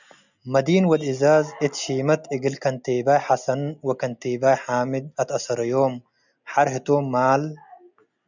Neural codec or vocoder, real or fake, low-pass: none; real; 7.2 kHz